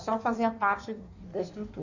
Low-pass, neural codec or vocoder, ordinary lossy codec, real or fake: 7.2 kHz; codec, 16 kHz in and 24 kHz out, 1.1 kbps, FireRedTTS-2 codec; none; fake